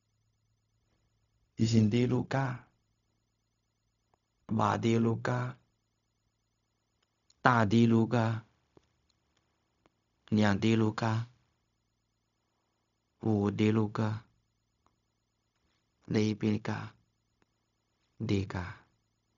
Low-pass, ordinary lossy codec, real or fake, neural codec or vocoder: 7.2 kHz; none; fake; codec, 16 kHz, 0.4 kbps, LongCat-Audio-Codec